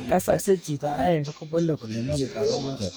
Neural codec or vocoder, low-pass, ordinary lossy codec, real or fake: codec, 44.1 kHz, 2.6 kbps, DAC; none; none; fake